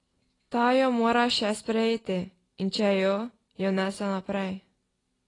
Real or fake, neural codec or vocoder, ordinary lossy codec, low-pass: real; none; AAC, 32 kbps; 10.8 kHz